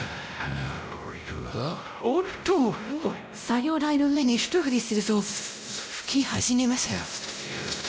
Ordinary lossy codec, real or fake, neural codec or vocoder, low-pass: none; fake; codec, 16 kHz, 0.5 kbps, X-Codec, WavLM features, trained on Multilingual LibriSpeech; none